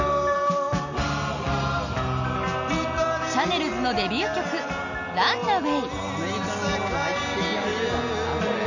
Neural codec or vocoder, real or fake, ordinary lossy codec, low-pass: none; real; none; 7.2 kHz